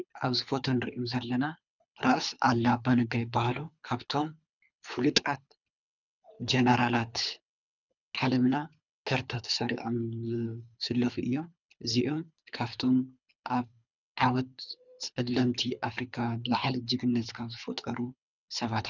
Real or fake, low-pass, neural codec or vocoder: fake; 7.2 kHz; codec, 24 kHz, 3 kbps, HILCodec